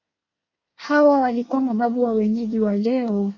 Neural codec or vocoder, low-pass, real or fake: codec, 24 kHz, 1 kbps, SNAC; 7.2 kHz; fake